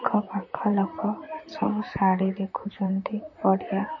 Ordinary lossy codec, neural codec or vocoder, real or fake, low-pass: MP3, 32 kbps; vocoder, 44.1 kHz, 128 mel bands, Pupu-Vocoder; fake; 7.2 kHz